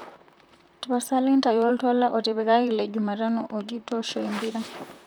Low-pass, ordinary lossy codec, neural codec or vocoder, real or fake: none; none; vocoder, 44.1 kHz, 128 mel bands, Pupu-Vocoder; fake